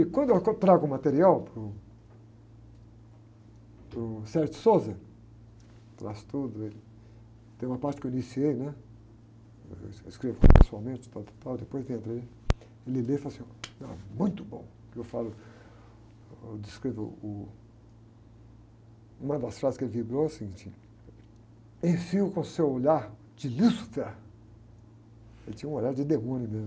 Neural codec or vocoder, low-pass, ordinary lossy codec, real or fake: none; none; none; real